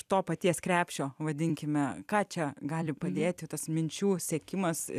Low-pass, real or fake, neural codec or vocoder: 14.4 kHz; fake; vocoder, 44.1 kHz, 128 mel bands every 256 samples, BigVGAN v2